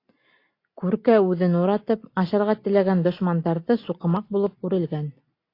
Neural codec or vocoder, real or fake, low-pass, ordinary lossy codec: none; real; 5.4 kHz; AAC, 32 kbps